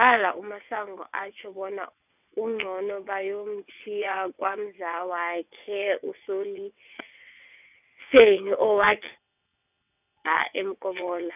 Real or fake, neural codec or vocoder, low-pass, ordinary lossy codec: fake; vocoder, 22.05 kHz, 80 mel bands, WaveNeXt; 3.6 kHz; none